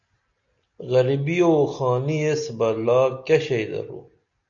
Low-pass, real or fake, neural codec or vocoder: 7.2 kHz; real; none